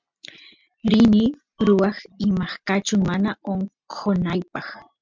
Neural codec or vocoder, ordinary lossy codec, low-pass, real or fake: none; MP3, 64 kbps; 7.2 kHz; real